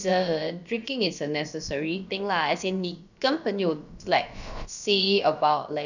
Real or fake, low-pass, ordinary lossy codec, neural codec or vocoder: fake; 7.2 kHz; none; codec, 16 kHz, about 1 kbps, DyCAST, with the encoder's durations